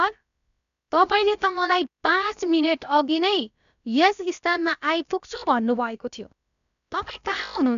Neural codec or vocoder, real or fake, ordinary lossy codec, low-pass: codec, 16 kHz, about 1 kbps, DyCAST, with the encoder's durations; fake; none; 7.2 kHz